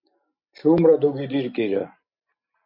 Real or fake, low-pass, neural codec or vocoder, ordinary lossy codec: real; 5.4 kHz; none; MP3, 32 kbps